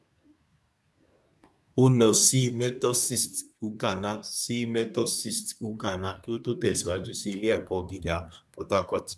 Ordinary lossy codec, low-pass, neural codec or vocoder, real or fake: none; none; codec, 24 kHz, 1 kbps, SNAC; fake